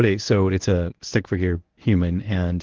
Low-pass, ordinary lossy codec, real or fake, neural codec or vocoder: 7.2 kHz; Opus, 16 kbps; fake; codec, 24 kHz, 0.9 kbps, WavTokenizer, medium speech release version 1